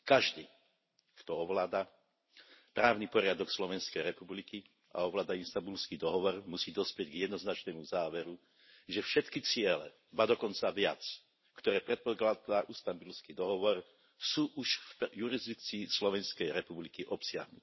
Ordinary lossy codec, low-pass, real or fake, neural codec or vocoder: MP3, 24 kbps; 7.2 kHz; real; none